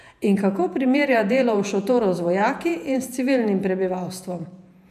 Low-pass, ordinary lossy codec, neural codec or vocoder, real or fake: 14.4 kHz; none; vocoder, 48 kHz, 128 mel bands, Vocos; fake